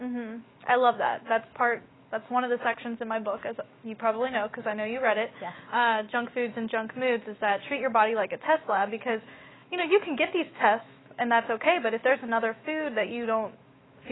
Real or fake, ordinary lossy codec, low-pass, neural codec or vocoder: fake; AAC, 16 kbps; 7.2 kHz; autoencoder, 48 kHz, 128 numbers a frame, DAC-VAE, trained on Japanese speech